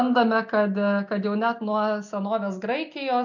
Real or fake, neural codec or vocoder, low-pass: real; none; 7.2 kHz